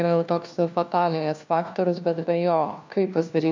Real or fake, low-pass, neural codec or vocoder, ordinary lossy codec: fake; 7.2 kHz; codec, 16 kHz, 1 kbps, FunCodec, trained on LibriTTS, 50 frames a second; MP3, 64 kbps